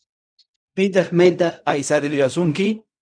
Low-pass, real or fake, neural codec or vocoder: 9.9 kHz; fake; codec, 16 kHz in and 24 kHz out, 0.4 kbps, LongCat-Audio-Codec, fine tuned four codebook decoder